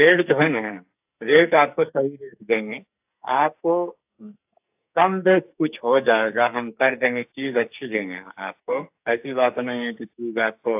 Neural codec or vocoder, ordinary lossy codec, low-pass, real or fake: codec, 44.1 kHz, 2.6 kbps, SNAC; AAC, 32 kbps; 3.6 kHz; fake